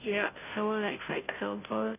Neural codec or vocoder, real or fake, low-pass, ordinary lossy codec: codec, 16 kHz, 0.5 kbps, FunCodec, trained on Chinese and English, 25 frames a second; fake; 3.6 kHz; none